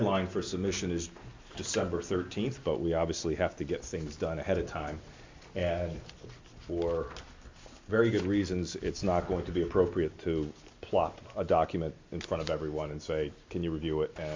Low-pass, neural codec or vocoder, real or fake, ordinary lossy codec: 7.2 kHz; none; real; MP3, 48 kbps